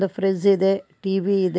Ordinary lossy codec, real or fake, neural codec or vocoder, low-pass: none; real; none; none